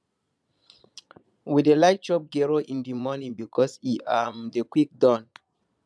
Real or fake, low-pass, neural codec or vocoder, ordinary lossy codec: fake; none; vocoder, 22.05 kHz, 80 mel bands, Vocos; none